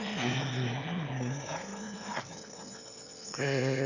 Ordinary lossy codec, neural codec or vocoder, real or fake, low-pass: none; autoencoder, 22.05 kHz, a latent of 192 numbers a frame, VITS, trained on one speaker; fake; 7.2 kHz